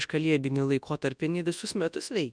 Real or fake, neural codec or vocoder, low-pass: fake; codec, 24 kHz, 0.9 kbps, WavTokenizer, large speech release; 9.9 kHz